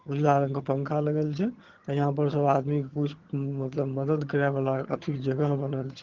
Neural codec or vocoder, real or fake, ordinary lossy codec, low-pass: vocoder, 22.05 kHz, 80 mel bands, HiFi-GAN; fake; Opus, 16 kbps; 7.2 kHz